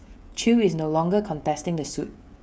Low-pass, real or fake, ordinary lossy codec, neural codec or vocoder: none; fake; none; codec, 16 kHz, 16 kbps, FreqCodec, smaller model